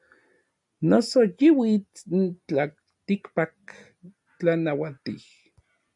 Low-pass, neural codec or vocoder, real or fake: 10.8 kHz; none; real